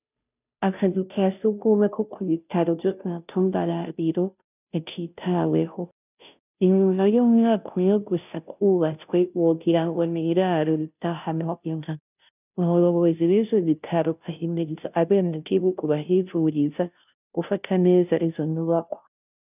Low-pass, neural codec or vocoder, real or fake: 3.6 kHz; codec, 16 kHz, 0.5 kbps, FunCodec, trained on Chinese and English, 25 frames a second; fake